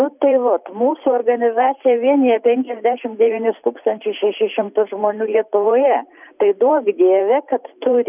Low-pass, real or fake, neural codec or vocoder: 3.6 kHz; fake; vocoder, 44.1 kHz, 128 mel bands every 512 samples, BigVGAN v2